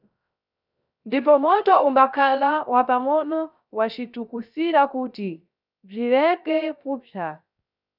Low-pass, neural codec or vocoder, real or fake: 5.4 kHz; codec, 16 kHz, 0.3 kbps, FocalCodec; fake